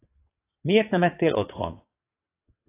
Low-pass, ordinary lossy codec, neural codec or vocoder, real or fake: 3.6 kHz; AAC, 16 kbps; vocoder, 22.05 kHz, 80 mel bands, WaveNeXt; fake